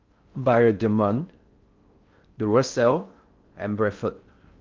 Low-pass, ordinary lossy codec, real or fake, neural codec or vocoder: 7.2 kHz; Opus, 32 kbps; fake; codec, 16 kHz in and 24 kHz out, 0.6 kbps, FocalCodec, streaming, 4096 codes